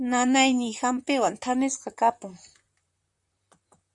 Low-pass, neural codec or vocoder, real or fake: 10.8 kHz; vocoder, 44.1 kHz, 128 mel bands, Pupu-Vocoder; fake